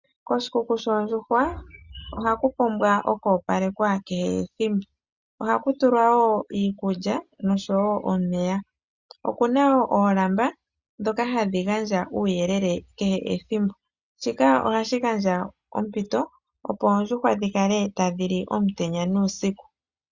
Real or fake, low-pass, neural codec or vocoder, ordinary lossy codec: real; 7.2 kHz; none; Opus, 64 kbps